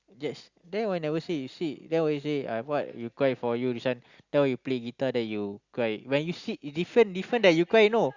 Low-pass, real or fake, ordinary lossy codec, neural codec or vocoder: 7.2 kHz; real; Opus, 64 kbps; none